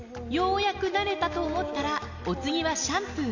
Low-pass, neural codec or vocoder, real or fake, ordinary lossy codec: 7.2 kHz; none; real; none